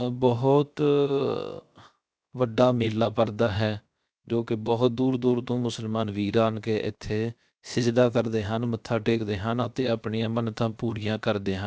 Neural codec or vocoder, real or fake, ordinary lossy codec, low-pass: codec, 16 kHz, 0.7 kbps, FocalCodec; fake; none; none